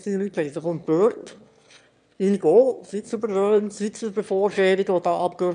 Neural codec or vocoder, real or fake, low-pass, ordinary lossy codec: autoencoder, 22.05 kHz, a latent of 192 numbers a frame, VITS, trained on one speaker; fake; 9.9 kHz; none